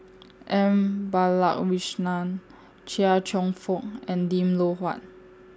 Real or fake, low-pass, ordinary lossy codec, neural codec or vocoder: real; none; none; none